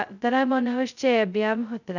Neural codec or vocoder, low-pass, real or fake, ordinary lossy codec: codec, 16 kHz, 0.2 kbps, FocalCodec; 7.2 kHz; fake; none